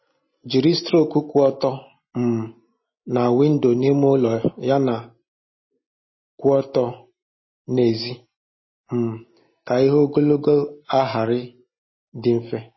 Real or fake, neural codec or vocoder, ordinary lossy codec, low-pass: real; none; MP3, 24 kbps; 7.2 kHz